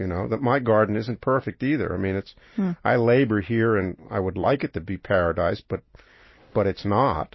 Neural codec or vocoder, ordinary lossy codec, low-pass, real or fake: none; MP3, 24 kbps; 7.2 kHz; real